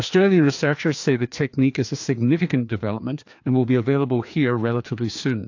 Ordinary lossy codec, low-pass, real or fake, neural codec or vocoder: AAC, 48 kbps; 7.2 kHz; fake; codec, 16 kHz, 2 kbps, FreqCodec, larger model